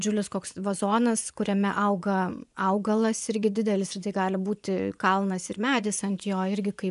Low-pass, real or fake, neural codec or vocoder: 10.8 kHz; fake; vocoder, 24 kHz, 100 mel bands, Vocos